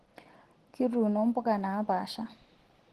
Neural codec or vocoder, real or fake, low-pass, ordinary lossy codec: none; real; 14.4 kHz; Opus, 16 kbps